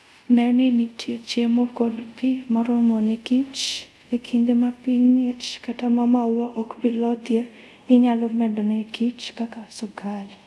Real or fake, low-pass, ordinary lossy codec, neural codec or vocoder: fake; none; none; codec, 24 kHz, 0.5 kbps, DualCodec